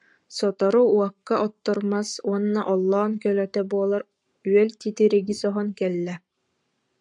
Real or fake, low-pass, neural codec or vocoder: fake; 10.8 kHz; codec, 44.1 kHz, 7.8 kbps, Pupu-Codec